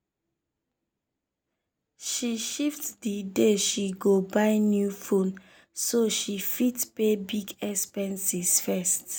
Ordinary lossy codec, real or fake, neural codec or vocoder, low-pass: none; real; none; none